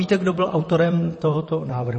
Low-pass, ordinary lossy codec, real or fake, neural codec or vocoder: 10.8 kHz; MP3, 32 kbps; fake; vocoder, 44.1 kHz, 128 mel bands, Pupu-Vocoder